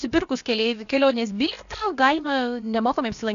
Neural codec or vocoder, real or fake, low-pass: codec, 16 kHz, about 1 kbps, DyCAST, with the encoder's durations; fake; 7.2 kHz